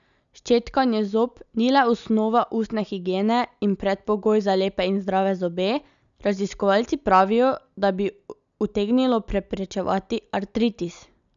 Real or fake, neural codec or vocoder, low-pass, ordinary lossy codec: real; none; 7.2 kHz; none